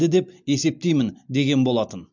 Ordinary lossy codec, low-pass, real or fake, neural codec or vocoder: MP3, 64 kbps; 7.2 kHz; real; none